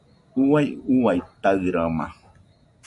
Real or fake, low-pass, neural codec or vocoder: real; 10.8 kHz; none